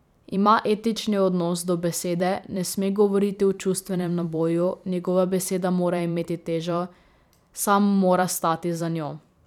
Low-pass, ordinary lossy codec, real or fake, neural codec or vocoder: 19.8 kHz; none; fake; vocoder, 44.1 kHz, 128 mel bands every 512 samples, BigVGAN v2